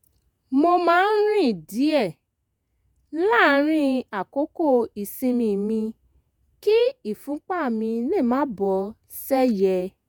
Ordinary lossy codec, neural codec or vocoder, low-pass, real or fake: none; vocoder, 48 kHz, 128 mel bands, Vocos; none; fake